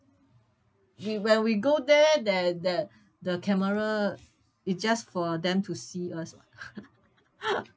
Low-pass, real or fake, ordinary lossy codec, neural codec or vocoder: none; real; none; none